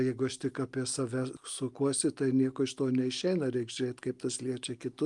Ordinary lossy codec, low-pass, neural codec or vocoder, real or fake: Opus, 32 kbps; 10.8 kHz; none; real